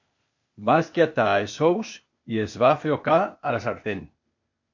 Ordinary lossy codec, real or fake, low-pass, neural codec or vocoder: MP3, 48 kbps; fake; 7.2 kHz; codec, 16 kHz, 0.8 kbps, ZipCodec